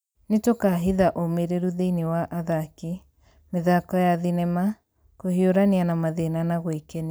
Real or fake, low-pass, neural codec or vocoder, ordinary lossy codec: real; none; none; none